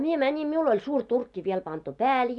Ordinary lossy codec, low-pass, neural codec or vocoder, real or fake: none; 10.8 kHz; none; real